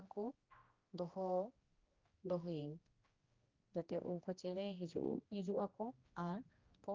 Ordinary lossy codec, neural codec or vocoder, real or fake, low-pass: Opus, 24 kbps; codec, 16 kHz, 1 kbps, X-Codec, HuBERT features, trained on general audio; fake; 7.2 kHz